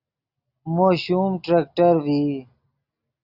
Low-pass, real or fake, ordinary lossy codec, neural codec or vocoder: 5.4 kHz; real; Opus, 64 kbps; none